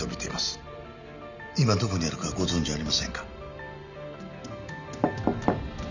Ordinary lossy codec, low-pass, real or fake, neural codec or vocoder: none; 7.2 kHz; real; none